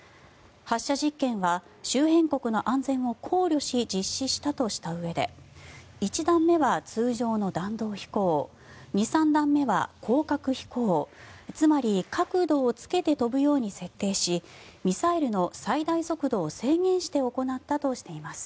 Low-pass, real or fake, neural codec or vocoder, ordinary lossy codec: none; real; none; none